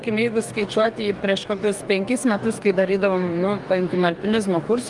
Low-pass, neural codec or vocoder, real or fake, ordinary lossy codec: 10.8 kHz; codec, 44.1 kHz, 2.6 kbps, DAC; fake; Opus, 32 kbps